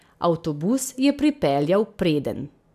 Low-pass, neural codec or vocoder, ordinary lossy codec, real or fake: 14.4 kHz; none; none; real